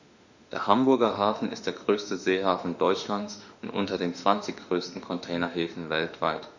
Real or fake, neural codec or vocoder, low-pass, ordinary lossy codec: fake; autoencoder, 48 kHz, 32 numbers a frame, DAC-VAE, trained on Japanese speech; 7.2 kHz; none